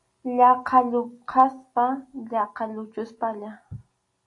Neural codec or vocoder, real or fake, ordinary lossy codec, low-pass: none; real; AAC, 48 kbps; 10.8 kHz